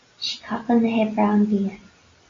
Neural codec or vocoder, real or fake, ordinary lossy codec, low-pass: none; real; AAC, 32 kbps; 7.2 kHz